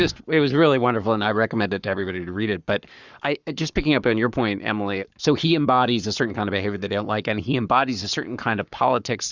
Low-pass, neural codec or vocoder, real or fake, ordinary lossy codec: 7.2 kHz; codec, 44.1 kHz, 7.8 kbps, Pupu-Codec; fake; Opus, 64 kbps